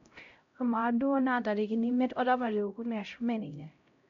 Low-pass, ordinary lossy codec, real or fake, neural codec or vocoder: 7.2 kHz; MP3, 48 kbps; fake; codec, 16 kHz, 0.5 kbps, X-Codec, HuBERT features, trained on LibriSpeech